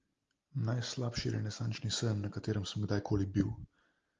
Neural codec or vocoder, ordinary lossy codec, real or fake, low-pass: none; Opus, 32 kbps; real; 7.2 kHz